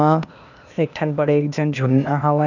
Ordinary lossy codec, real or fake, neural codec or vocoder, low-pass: none; fake; codec, 16 kHz, 0.8 kbps, ZipCodec; 7.2 kHz